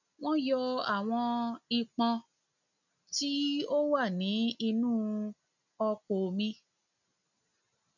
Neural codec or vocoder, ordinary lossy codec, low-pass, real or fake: none; none; 7.2 kHz; real